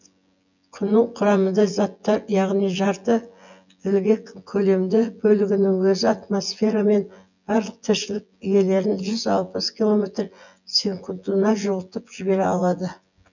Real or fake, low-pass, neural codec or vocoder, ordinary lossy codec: fake; 7.2 kHz; vocoder, 24 kHz, 100 mel bands, Vocos; none